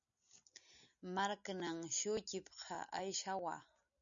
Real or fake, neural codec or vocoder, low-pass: real; none; 7.2 kHz